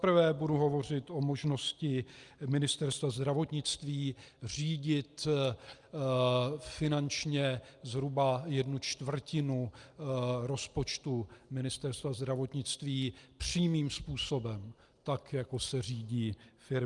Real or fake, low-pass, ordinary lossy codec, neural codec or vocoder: real; 10.8 kHz; Opus, 32 kbps; none